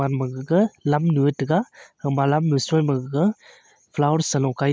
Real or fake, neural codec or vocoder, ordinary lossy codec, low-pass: real; none; none; none